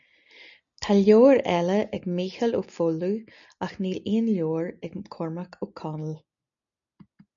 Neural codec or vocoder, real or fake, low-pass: none; real; 7.2 kHz